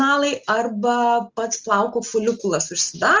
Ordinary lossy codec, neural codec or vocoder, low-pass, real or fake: Opus, 32 kbps; none; 7.2 kHz; real